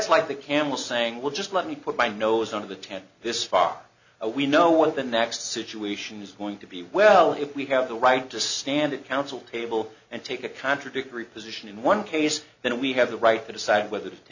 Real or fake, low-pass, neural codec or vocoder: real; 7.2 kHz; none